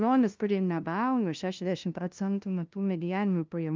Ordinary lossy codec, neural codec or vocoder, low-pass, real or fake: Opus, 24 kbps; codec, 16 kHz, 0.5 kbps, FunCodec, trained on LibriTTS, 25 frames a second; 7.2 kHz; fake